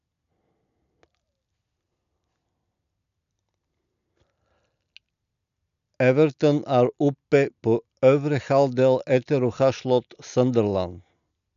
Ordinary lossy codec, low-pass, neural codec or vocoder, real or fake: none; 7.2 kHz; none; real